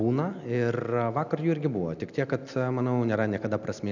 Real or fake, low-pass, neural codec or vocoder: real; 7.2 kHz; none